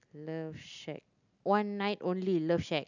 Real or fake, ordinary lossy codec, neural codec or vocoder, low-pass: real; none; none; 7.2 kHz